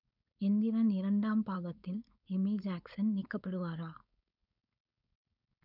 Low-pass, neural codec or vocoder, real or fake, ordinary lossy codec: 5.4 kHz; codec, 16 kHz, 4.8 kbps, FACodec; fake; none